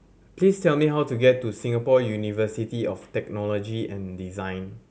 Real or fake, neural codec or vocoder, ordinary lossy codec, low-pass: real; none; none; none